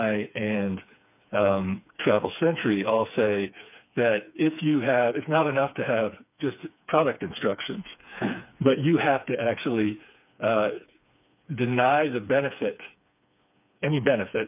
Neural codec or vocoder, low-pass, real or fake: codec, 16 kHz, 4 kbps, FreqCodec, smaller model; 3.6 kHz; fake